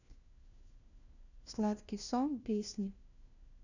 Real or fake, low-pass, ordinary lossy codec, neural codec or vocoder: fake; 7.2 kHz; none; codec, 16 kHz, 1 kbps, FunCodec, trained on LibriTTS, 50 frames a second